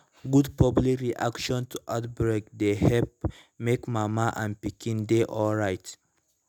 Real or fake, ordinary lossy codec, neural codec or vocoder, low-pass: real; none; none; none